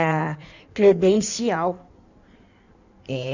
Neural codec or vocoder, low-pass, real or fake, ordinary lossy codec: codec, 16 kHz in and 24 kHz out, 1.1 kbps, FireRedTTS-2 codec; 7.2 kHz; fake; none